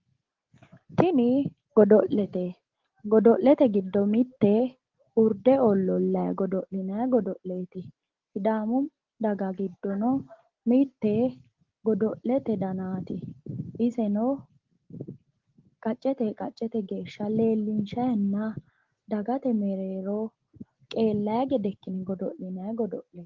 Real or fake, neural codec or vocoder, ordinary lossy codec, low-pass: real; none; Opus, 16 kbps; 7.2 kHz